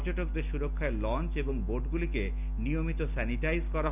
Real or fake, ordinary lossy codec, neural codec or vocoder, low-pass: real; none; none; 3.6 kHz